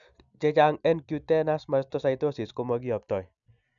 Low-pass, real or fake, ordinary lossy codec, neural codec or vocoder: 7.2 kHz; real; none; none